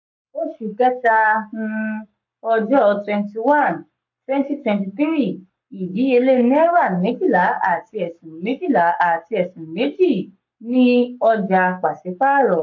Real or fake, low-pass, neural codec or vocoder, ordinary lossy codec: fake; 7.2 kHz; codec, 44.1 kHz, 7.8 kbps, Pupu-Codec; MP3, 64 kbps